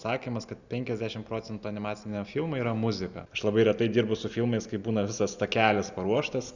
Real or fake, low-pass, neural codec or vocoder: real; 7.2 kHz; none